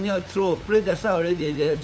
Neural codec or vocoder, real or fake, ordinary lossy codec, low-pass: codec, 16 kHz, 8 kbps, FunCodec, trained on LibriTTS, 25 frames a second; fake; none; none